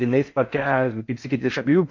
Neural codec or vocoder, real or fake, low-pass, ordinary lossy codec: codec, 16 kHz in and 24 kHz out, 0.6 kbps, FocalCodec, streaming, 4096 codes; fake; 7.2 kHz; MP3, 48 kbps